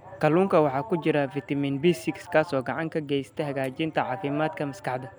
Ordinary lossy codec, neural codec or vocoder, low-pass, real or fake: none; none; none; real